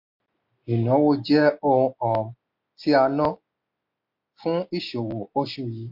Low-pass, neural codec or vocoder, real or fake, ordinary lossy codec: 5.4 kHz; none; real; MP3, 48 kbps